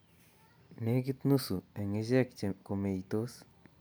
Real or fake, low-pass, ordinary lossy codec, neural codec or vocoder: real; none; none; none